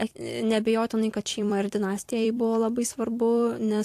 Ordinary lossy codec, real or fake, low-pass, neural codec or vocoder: AAC, 64 kbps; fake; 14.4 kHz; vocoder, 44.1 kHz, 128 mel bands every 256 samples, BigVGAN v2